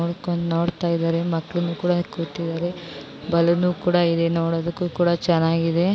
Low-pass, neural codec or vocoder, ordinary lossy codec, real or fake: none; none; none; real